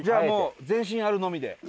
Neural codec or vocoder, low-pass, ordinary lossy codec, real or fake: none; none; none; real